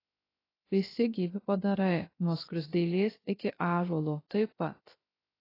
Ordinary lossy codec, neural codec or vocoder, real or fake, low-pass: AAC, 24 kbps; codec, 16 kHz, 0.3 kbps, FocalCodec; fake; 5.4 kHz